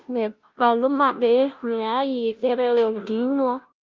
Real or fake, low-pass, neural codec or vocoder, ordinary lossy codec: fake; 7.2 kHz; codec, 16 kHz, 0.5 kbps, FunCodec, trained on Chinese and English, 25 frames a second; Opus, 24 kbps